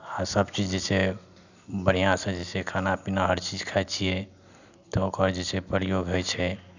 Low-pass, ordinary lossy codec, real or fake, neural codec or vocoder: 7.2 kHz; none; real; none